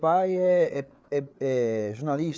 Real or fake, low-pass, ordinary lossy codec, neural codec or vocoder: fake; none; none; codec, 16 kHz, 8 kbps, FreqCodec, larger model